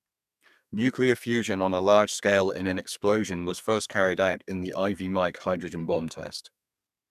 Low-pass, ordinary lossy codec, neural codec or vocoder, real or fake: 14.4 kHz; none; codec, 32 kHz, 1.9 kbps, SNAC; fake